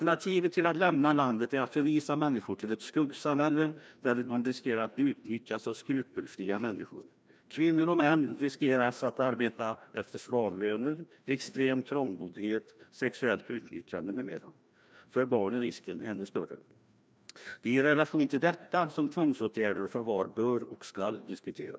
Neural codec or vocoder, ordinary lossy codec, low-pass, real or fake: codec, 16 kHz, 1 kbps, FreqCodec, larger model; none; none; fake